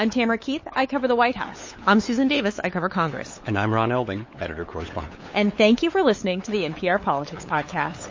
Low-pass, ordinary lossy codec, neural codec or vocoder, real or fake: 7.2 kHz; MP3, 32 kbps; codec, 16 kHz, 8 kbps, FunCodec, trained on LibriTTS, 25 frames a second; fake